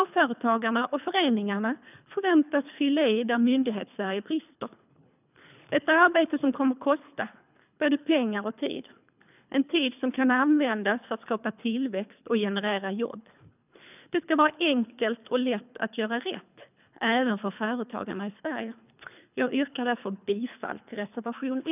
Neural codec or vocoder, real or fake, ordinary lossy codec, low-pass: codec, 24 kHz, 3 kbps, HILCodec; fake; none; 3.6 kHz